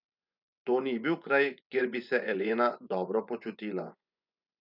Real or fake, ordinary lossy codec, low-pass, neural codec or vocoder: fake; none; 5.4 kHz; vocoder, 24 kHz, 100 mel bands, Vocos